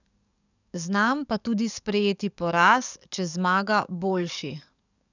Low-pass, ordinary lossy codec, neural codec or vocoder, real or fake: 7.2 kHz; none; codec, 44.1 kHz, 7.8 kbps, DAC; fake